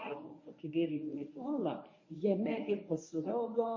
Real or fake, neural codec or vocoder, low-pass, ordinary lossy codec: fake; codec, 24 kHz, 0.9 kbps, WavTokenizer, medium speech release version 1; 7.2 kHz; MP3, 32 kbps